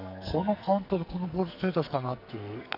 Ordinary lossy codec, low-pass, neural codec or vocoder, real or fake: none; 5.4 kHz; codec, 44.1 kHz, 2.6 kbps, SNAC; fake